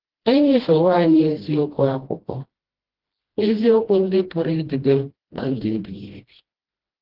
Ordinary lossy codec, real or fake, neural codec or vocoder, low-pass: Opus, 16 kbps; fake; codec, 16 kHz, 1 kbps, FreqCodec, smaller model; 5.4 kHz